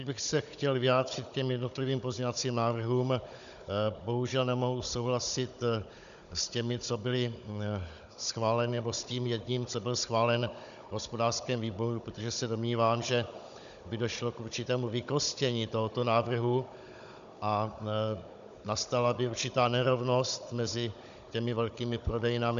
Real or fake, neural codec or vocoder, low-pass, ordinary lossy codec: fake; codec, 16 kHz, 16 kbps, FunCodec, trained on Chinese and English, 50 frames a second; 7.2 kHz; MP3, 96 kbps